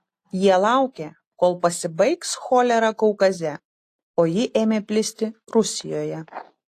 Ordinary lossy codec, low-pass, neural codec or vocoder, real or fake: AAC, 48 kbps; 14.4 kHz; none; real